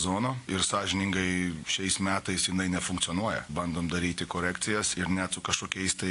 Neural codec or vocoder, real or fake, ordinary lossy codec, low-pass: none; real; AAC, 48 kbps; 10.8 kHz